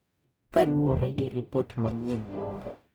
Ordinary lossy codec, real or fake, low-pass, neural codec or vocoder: none; fake; none; codec, 44.1 kHz, 0.9 kbps, DAC